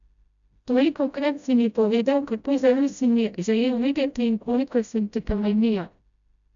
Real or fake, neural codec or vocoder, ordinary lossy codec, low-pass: fake; codec, 16 kHz, 0.5 kbps, FreqCodec, smaller model; none; 7.2 kHz